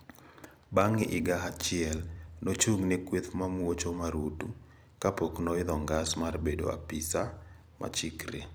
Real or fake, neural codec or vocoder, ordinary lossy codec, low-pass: fake; vocoder, 44.1 kHz, 128 mel bands every 256 samples, BigVGAN v2; none; none